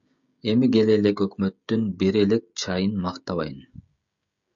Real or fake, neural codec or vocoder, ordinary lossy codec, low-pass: fake; codec, 16 kHz, 16 kbps, FreqCodec, smaller model; MP3, 96 kbps; 7.2 kHz